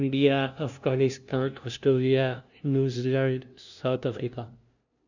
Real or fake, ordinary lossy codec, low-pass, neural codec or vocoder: fake; none; 7.2 kHz; codec, 16 kHz, 0.5 kbps, FunCodec, trained on LibriTTS, 25 frames a second